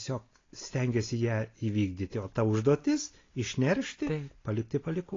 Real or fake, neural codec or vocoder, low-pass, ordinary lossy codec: real; none; 7.2 kHz; AAC, 32 kbps